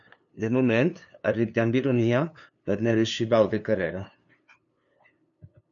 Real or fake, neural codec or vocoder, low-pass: fake; codec, 16 kHz, 2 kbps, FunCodec, trained on LibriTTS, 25 frames a second; 7.2 kHz